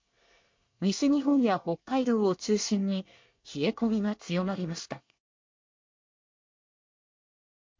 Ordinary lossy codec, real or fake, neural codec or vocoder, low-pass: MP3, 48 kbps; fake; codec, 24 kHz, 1 kbps, SNAC; 7.2 kHz